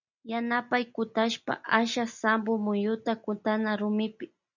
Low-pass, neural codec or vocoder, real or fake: 7.2 kHz; none; real